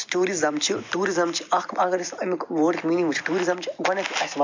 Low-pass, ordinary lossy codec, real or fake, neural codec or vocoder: 7.2 kHz; MP3, 64 kbps; real; none